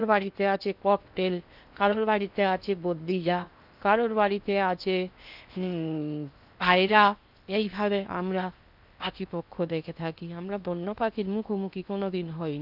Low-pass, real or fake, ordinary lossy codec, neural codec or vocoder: 5.4 kHz; fake; none; codec, 16 kHz in and 24 kHz out, 0.8 kbps, FocalCodec, streaming, 65536 codes